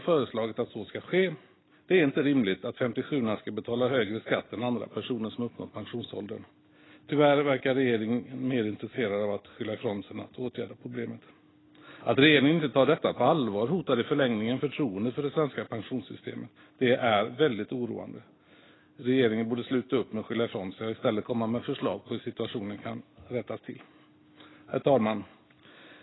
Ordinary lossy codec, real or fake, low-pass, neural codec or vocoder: AAC, 16 kbps; real; 7.2 kHz; none